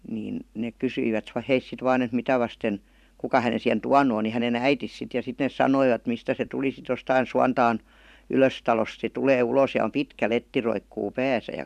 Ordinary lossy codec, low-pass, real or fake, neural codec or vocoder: none; 14.4 kHz; fake; vocoder, 44.1 kHz, 128 mel bands every 512 samples, BigVGAN v2